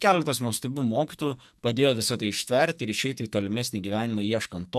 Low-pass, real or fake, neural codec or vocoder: 14.4 kHz; fake; codec, 44.1 kHz, 2.6 kbps, SNAC